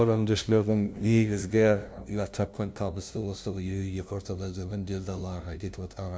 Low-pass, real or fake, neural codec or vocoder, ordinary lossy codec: none; fake; codec, 16 kHz, 0.5 kbps, FunCodec, trained on LibriTTS, 25 frames a second; none